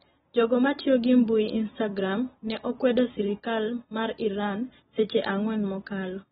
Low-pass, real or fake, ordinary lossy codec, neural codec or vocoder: 14.4 kHz; real; AAC, 16 kbps; none